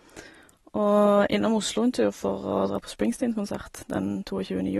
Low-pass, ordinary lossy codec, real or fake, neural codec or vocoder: 14.4 kHz; AAC, 32 kbps; real; none